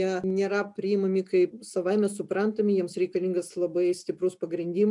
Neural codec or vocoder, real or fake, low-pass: none; real; 10.8 kHz